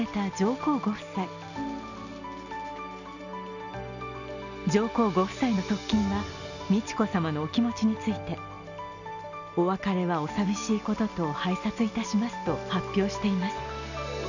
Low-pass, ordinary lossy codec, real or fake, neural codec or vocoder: 7.2 kHz; none; real; none